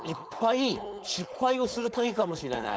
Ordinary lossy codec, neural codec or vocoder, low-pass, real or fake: none; codec, 16 kHz, 4.8 kbps, FACodec; none; fake